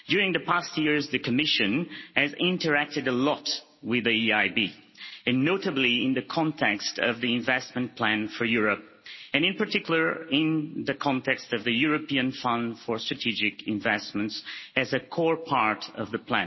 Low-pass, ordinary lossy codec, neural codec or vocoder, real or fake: 7.2 kHz; MP3, 24 kbps; none; real